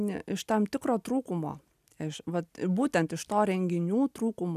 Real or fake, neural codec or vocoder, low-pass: real; none; 14.4 kHz